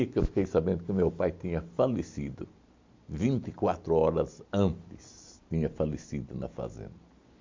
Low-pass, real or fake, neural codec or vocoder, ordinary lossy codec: 7.2 kHz; real; none; MP3, 48 kbps